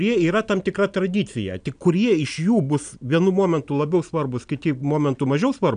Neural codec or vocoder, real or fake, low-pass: none; real; 9.9 kHz